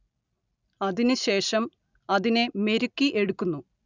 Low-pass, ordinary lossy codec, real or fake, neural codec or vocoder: 7.2 kHz; none; real; none